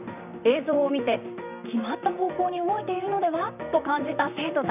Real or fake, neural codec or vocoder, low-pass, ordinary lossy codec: fake; vocoder, 44.1 kHz, 128 mel bands, Pupu-Vocoder; 3.6 kHz; none